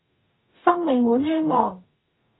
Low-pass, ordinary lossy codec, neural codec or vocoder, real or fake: 7.2 kHz; AAC, 16 kbps; codec, 44.1 kHz, 0.9 kbps, DAC; fake